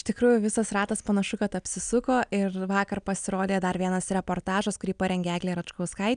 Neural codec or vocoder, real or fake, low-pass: none; real; 9.9 kHz